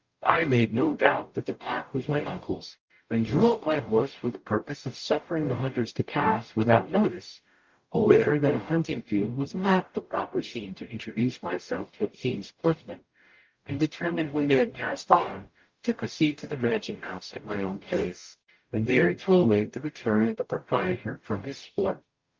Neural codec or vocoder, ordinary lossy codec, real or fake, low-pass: codec, 44.1 kHz, 0.9 kbps, DAC; Opus, 32 kbps; fake; 7.2 kHz